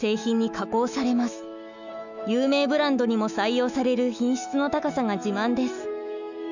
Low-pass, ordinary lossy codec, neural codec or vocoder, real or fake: 7.2 kHz; none; autoencoder, 48 kHz, 128 numbers a frame, DAC-VAE, trained on Japanese speech; fake